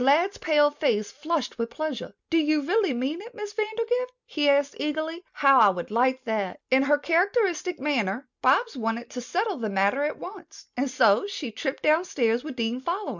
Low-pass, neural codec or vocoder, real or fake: 7.2 kHz; none; real